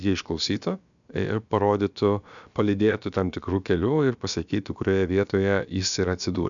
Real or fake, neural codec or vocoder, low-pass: fake; codec, 16 kHz, about 1 kbps, DyCAST, with the encoder's durations; 7.2 kHz